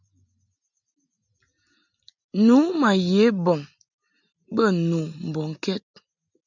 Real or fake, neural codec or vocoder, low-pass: real; none; 7.2 kHz